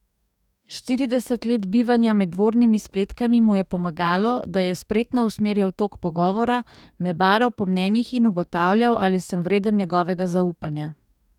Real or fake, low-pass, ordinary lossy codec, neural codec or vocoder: fake; 19.8 kHz; none; codec, 44.1 kHz, 2.6 kbps, DAC